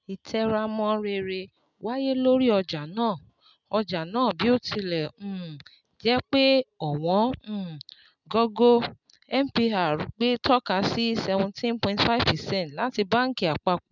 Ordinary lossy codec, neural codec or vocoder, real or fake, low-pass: none; none; real; 7.2 kHz